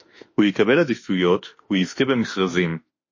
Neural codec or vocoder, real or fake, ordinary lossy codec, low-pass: autoencoder, 48 kHz, 32 numbers a frame, DAC-VAE, trained on Japanese speech; fake; MP3, 32 kbps; 7.2 kHz